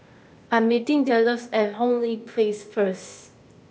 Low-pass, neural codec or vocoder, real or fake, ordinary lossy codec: none; codec, 16 kHz, 0.8 kbps, ZipCodec; fake; none